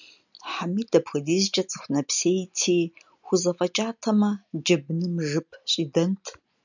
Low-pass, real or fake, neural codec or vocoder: 7.2 kHz; real; none